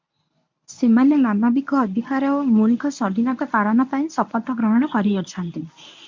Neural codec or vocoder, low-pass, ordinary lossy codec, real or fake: codec, 24 kHz, 0.9 kbps, WavTokenizer, medium speech release version 1; 7.2 kHz; MP3, 64 kbps; fake